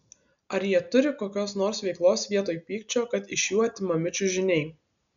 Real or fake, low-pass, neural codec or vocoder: real; 7.2 kHz; none